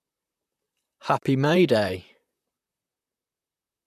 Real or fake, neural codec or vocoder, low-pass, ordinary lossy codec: fake; vocoder, 44.1 kHz, 128 mel bands, Pupu-Vocoder; 14.4 kHz; none